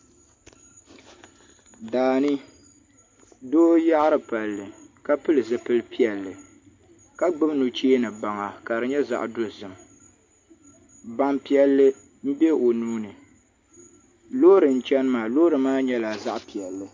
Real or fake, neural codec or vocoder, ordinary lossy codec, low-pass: real; none; MP3, 48 kbps; 7.2 kHz